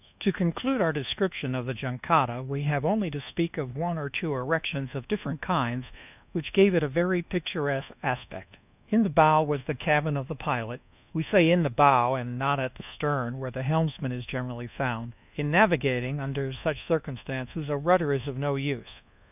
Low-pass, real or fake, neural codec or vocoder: 3.6 kHz; fake; codec, 24 kHz, 1.2 kbps, DualCodec